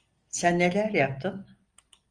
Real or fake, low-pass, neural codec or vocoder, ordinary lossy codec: real; 9.9 kHz; none; Opus, 32 kbps